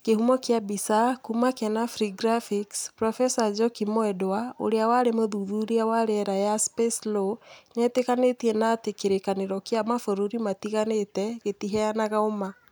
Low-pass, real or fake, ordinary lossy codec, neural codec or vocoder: none; real; none; none